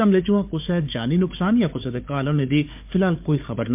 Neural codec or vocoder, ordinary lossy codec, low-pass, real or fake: codec, 16 kHz, 4 kbps, FunCodec, trained on Chinese and English, 50 frames a second; MP3, 32 kbps; 3.6 kHz; fake